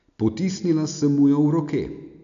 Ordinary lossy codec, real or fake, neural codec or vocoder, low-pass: none; real; none; 7.2 kHz